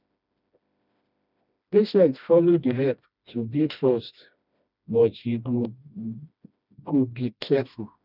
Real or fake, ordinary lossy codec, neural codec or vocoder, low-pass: fake; none; codec, 16 kHz, 1 kbps, FreqCodec, smaller model; 5.4 kHz